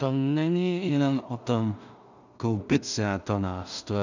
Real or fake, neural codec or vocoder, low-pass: fake; codec, 16 kHz in and 24 kHz out, 0.4 kbps, LongCat-Audio-Codec, two codebook decoder; 7.2 kHz